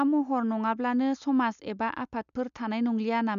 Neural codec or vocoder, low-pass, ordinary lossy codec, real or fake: none; 7.2 kHz; none; real